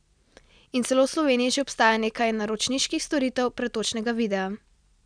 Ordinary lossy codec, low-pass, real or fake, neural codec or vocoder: none; 9.9 kHz; real; none